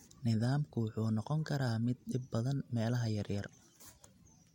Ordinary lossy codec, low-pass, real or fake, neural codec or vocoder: MP3, 64 kbps; 19.8 kHz; real; none